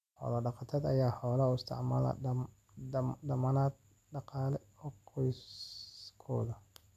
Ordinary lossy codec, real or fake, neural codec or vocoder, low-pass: none; real; none; 14.4 kHz